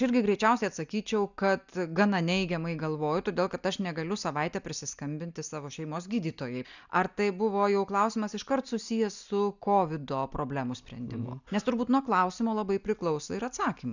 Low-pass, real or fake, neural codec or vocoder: 7.2 kHz; real; none